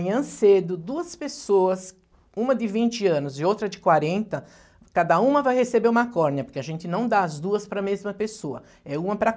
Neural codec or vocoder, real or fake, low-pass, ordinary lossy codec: none; real; none; none